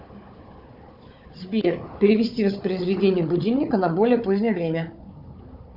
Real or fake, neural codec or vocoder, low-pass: fake; codec, 16 kHz, 4 kbps, FunCodec, trained on Chinese and English, 50 frames a second; 5.4 kHz